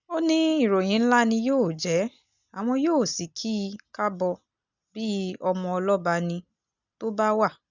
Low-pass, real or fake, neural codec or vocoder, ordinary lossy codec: 7.2 kHz; real; none; none